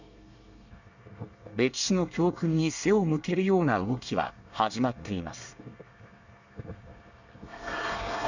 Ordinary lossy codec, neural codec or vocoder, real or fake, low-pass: none; codec, 24 kHz, 1 kbps, SNAC; fake; 7.2 kHz